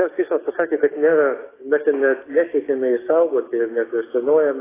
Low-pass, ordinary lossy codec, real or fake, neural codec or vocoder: 3.6 kHz; AAC, 16 kbps; fake; codec, 16 kHz, 6 kbps, DAC